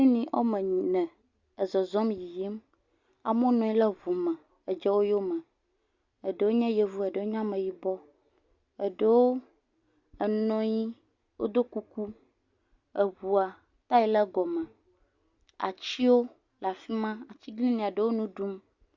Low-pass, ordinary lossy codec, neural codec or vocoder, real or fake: 7.2 kHz; Opus, 64 kbps; none; real